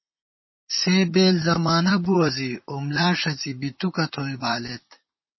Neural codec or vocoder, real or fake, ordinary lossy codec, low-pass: vocoder, 44.1 kHz, 128 mel bands, Pupu-Vocoder; fake; MP3, 24 kbps; 7.2 kHz